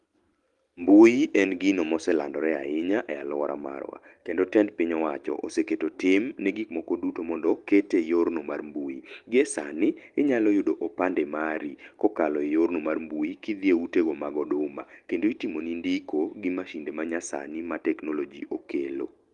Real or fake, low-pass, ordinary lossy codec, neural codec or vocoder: real; 10.8 kHz; Opus, 24 kbps; none